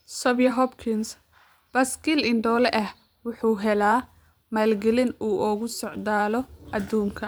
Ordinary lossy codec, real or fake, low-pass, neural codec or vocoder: none; fake; none; vocoder, 44.1 kHz, 128 mel bands every 512 samples, BigVGAN v2